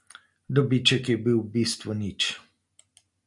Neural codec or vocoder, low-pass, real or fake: none; 10.8 kHz; real